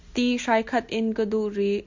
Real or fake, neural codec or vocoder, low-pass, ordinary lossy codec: real; none; 7.2 kHz; MP3, 64 kbps